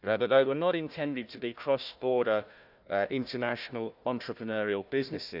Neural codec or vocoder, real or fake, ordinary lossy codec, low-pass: codec, 16 kHz, 1 kbps, FunCodec, trained on Chinese and English, 50 frames a second; fake; none; 5.4 kHz